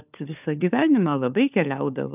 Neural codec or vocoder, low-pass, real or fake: codec, 16 kHz, 4 kbps, FunCodec, trained on LibriTTS, 50 frames a second; 3.6 kHz; fake